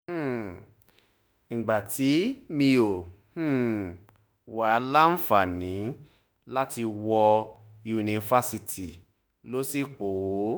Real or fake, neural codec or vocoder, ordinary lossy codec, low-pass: fake; autoencoder, 48 kHz, 32 numbers a frame, DAC-VAE, trained on Japanese speech; none; none